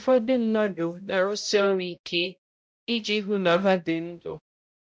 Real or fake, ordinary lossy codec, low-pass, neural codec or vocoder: fake; none; none; codec, 16 kHz, 0.5 kbps, X-Codec, HuBERT features, trained on balanced general audio